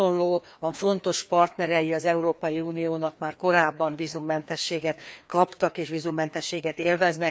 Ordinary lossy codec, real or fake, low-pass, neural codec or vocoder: none; fake; none; codec, 16 kHz, 2 kbps, FreqCodec, larger model